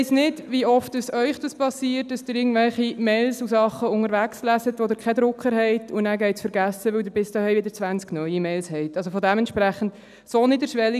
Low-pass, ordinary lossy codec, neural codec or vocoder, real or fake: 14.4 kHz; none; none; real